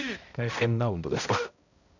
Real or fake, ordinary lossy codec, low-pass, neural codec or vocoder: fake; none; 7.2 kHz; codec, 16 kHz, 0.5 kbps, X-Codec, HuBERT features, trained on balanced general audio